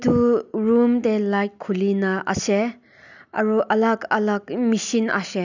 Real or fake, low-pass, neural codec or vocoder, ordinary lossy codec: real; 7.2 kHz; none; none